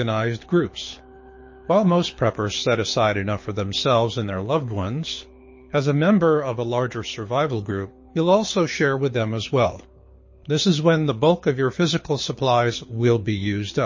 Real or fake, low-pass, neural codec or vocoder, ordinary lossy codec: fake; 7.2 kHz; codec, 24 kHz, 6 kbps, HILCodec; MP3, 32 kbps